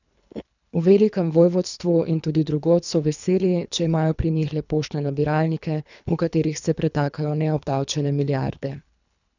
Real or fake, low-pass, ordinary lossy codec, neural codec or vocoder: fake; 7.2 kHz; none; codec, 24 kHz, 3 kbps, HILCodec